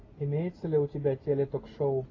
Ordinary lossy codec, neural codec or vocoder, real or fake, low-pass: AAC, 32 kbps; none; real; 7.2 kHz